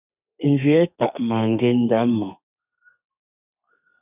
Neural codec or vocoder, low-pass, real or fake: codec, 32 kHz, 1.9 kbps, SNAC; 3.6 kHz; fake